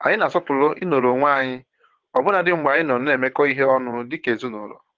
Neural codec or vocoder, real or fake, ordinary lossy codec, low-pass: vocoder, 22.05 kHz, 80 mel bands, WaveNeXt; fake; Opus, 16 kbps; 7.2 kHz